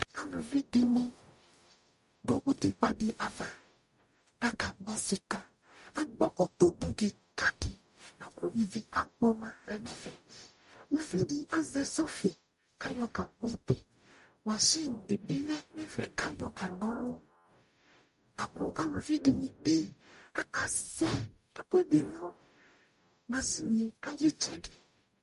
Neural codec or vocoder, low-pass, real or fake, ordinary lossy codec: codec, 44.1 kHz, 0.9 kbps, DAC; 14.4 kHz; fake; MP3, 48 kbps